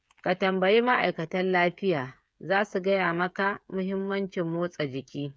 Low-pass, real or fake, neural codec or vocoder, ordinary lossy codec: none; fake; codec, 16 kHz, 8 kbps, FreqCodec, smaller model; none